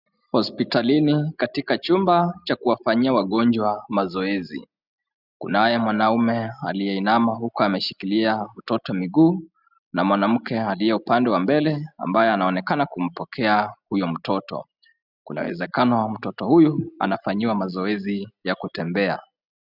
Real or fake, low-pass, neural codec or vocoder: real; 5.4 kHz; none